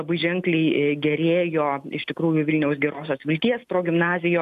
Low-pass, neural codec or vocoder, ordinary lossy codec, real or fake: 14.4 kHz; none; MP3, 96 kbps; real